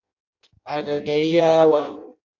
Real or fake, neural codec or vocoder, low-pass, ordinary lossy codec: fake; codec, 16 kHz in and 24 kHz out, 0.6 kbps, FireRedTTS-2 codec; 7.2 kHz; AAC, 48 kbps